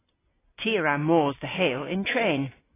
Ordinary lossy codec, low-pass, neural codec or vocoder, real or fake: AAC, 16 kbps; 3.6 kHz; none; real